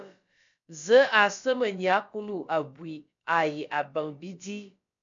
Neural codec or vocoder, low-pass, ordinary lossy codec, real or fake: codec, 16 kHz, about 1 kbps, DyCAST, with the encoder's durations; 7.2 kHz; MP3, 64 kbps; fake